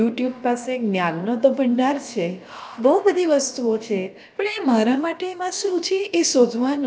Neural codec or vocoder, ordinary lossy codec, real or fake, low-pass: codec, 16 kHz, about 1 kbps, DyCAST, with the encoder's durations; none; fake; none